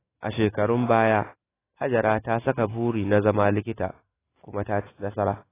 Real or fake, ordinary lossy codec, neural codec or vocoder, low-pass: fake; AAC, 16 kbps; autoencoder, 48 kHz, 128 numbers a frame, DAC-VAE, trained on Japanese speech; 3.6 kHz